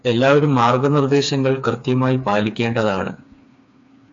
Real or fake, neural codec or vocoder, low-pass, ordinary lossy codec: fake; codec, 16 kHz, 4 kbps, FreqCodec, smaller model; 7.2 kHz; AAC, 64 kbps